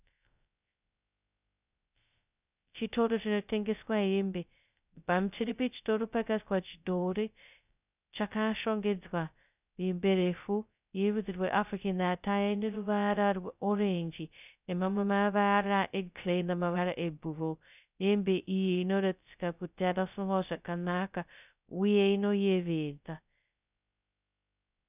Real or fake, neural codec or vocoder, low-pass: fake; codec, 16 kHz, 0.2 kbps, FocalCodec; 3.6 kHz